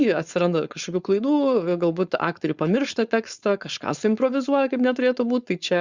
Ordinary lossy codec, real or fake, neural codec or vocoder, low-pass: Opus, 64 kbps; fake; codec, 16 kHz, 4.8 kbps, FACodec; 7.2 kHz